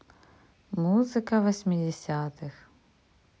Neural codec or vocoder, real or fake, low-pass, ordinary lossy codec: none; real; none; none